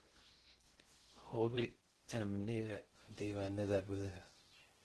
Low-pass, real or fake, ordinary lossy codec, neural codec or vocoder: 10.8 kHz; fake; Opus, 16 kbps; codec, 16 kHz in and 24 kHz out, 0.6 kbps, FocalCodec, streaming, 2048 codes